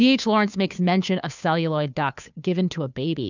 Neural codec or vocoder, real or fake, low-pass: codec, 16 kHz, 2 kbps, FunCodec, trained on Chinese and English, 25 frames a second; fake; 7.2 kHz